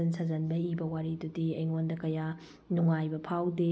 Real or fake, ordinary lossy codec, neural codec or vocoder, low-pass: real; none; none; none